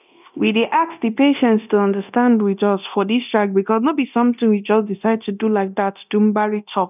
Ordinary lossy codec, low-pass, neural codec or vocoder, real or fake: none; 3.6 kHz; codec, 24 kHz, 0.9 kbps, DualCodec; fake